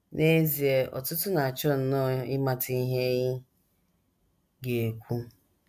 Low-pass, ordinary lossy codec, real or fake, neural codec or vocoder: 14.4 kHz; none; real; none